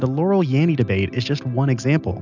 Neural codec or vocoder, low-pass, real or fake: none; 7.2 kHz; real